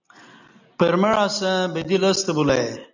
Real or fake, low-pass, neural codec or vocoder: real; 7.2 kHz; none